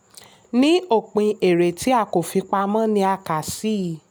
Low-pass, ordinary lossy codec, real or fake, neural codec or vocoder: none; none; real; none